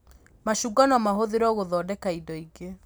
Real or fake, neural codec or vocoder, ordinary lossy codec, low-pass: real; none; none; none